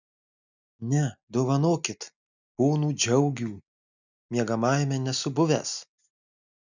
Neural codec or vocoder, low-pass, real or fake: none; 7.2 kHz; real